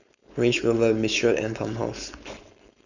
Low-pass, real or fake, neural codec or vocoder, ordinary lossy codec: 7.2 kHz; fake; codec, 16 kHz, 4.8 kbps, FACodec; none